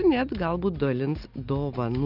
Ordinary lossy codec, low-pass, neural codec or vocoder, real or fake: Opus, 24 kbps; 5.4 kHz; none; real